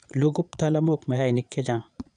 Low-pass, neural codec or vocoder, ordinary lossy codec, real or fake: 9.9 kHz; vocoder, 22.05 kHz, 80 mel bands, Vocos; none; fake